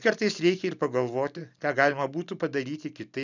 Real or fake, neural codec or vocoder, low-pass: real; none; 7.2 kHz